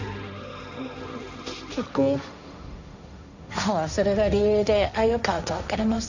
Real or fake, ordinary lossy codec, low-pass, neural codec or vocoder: fake; none; 7.2 kHz; codec, 16 kHz, 1.1 kbps, Voila-Tokenizer